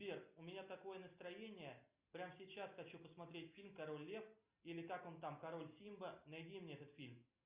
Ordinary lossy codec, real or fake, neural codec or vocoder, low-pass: Opus, 64 kbps; real; none; 3.6 kHz